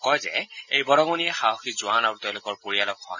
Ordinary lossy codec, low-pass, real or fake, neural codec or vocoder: none; 7.2 kHz; real; none